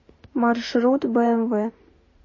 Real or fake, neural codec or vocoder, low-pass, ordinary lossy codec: fake; autoencoder, 48 kHz, 32 numbers a frame, DAC-VAE, trained on Japanese speech; 7.2 kHz; MP3, 32 kbps